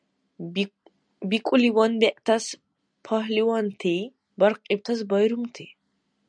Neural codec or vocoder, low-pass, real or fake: none; 9.9 kHz; real